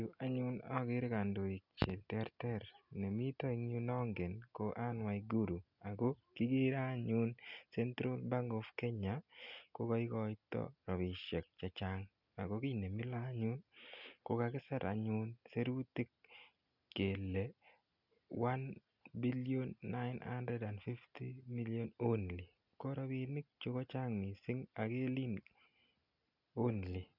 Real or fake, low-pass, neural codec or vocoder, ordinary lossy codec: real; 5.4 kHz; none; none